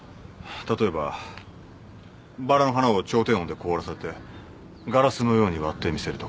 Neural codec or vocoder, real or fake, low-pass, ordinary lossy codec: none; real; none; none